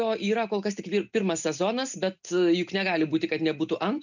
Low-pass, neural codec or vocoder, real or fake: 7.2 kHz; none; real